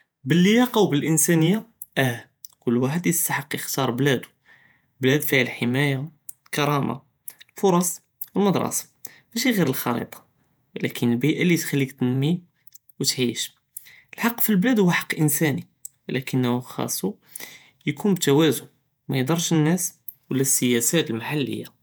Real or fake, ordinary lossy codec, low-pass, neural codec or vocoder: fake; none; none; vocoder, 48 kHz, 128 mel bands, Vocos